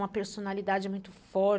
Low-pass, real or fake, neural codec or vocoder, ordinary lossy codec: none; real; none; none